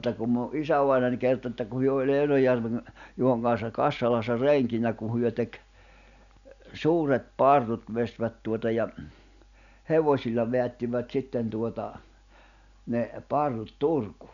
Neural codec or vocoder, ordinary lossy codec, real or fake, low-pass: none; none; real; 7.2 kHz